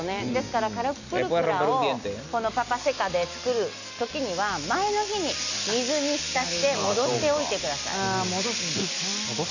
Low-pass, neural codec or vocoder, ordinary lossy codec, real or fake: 7.2 kHz; none; none; real